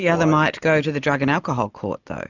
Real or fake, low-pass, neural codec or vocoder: real; 7.2 kHz; none